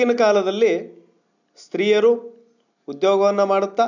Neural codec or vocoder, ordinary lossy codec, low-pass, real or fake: none; none; 7.2 kHz; real